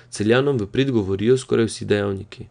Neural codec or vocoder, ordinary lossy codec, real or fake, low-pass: none; none; real; 9.9 kHz